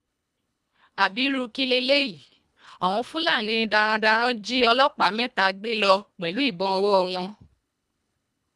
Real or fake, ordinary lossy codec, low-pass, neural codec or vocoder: fake; none; none; codec, 24 kHz, 1.5 kbps, HILCodec